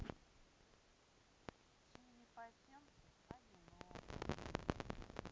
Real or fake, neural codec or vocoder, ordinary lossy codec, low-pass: real; none; none; none